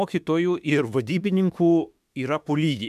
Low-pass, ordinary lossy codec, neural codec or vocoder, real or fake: 14.4 kHz; MP3, 96 kbps; autoencoder, 48 kHz, 32 numbers a frame, DAC-VAE, trained on Japanese speech; fake